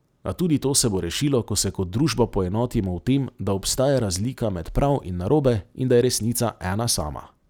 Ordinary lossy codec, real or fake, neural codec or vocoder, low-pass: none; real; none; none